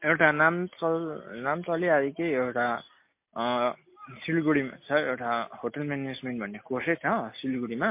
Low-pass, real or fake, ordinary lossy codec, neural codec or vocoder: 3.6 kHz; real; MP3, 24 kbps; none